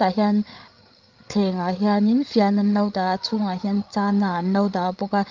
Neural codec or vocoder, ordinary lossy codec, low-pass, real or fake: codec, 16 kHz, 8 kbps, FreqCodec, larger model; Opus, 24 kbps; 7.2 kHz; fake